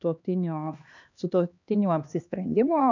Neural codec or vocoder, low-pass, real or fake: codec, 16 kHz, 2 kbps, X-Codec, HuBERT features, trained on LibriSpeech; 7.2 kHz; fake